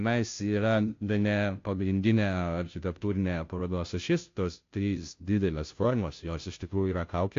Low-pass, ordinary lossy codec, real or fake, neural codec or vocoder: 7.2 kHz; AAC, 48 kbps; fake; codec, 16 kHz, 0.5 kbps, FunCodec, trained on Chinese and English, 25 frames a second